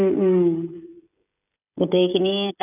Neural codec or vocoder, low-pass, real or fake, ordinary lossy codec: codec, 16 kHz, 16 kbps, FreqCodec, smaller model; 3.6 kHz; fake; none